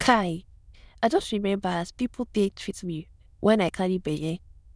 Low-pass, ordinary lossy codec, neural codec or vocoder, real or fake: none; none; autoencoder, 22.05 kHz, a latent of 192 numbers a frame, VITS, trained on many speakers; fake